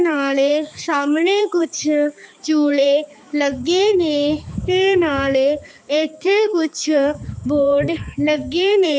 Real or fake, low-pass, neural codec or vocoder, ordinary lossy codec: fake; none; codec, 16 kHz, 4 kbps, X-Codec, HuBERT features, trained on balanced general audio; none